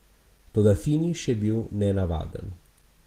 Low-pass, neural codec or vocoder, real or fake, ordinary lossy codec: 14.4 kHz; none; real; Opus, 16 kbps